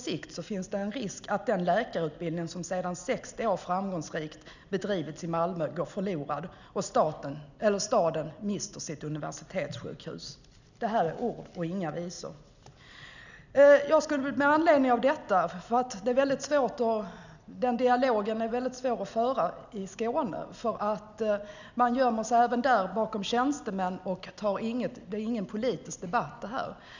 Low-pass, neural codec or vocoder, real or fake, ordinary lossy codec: 7.2 kHz; none; real; none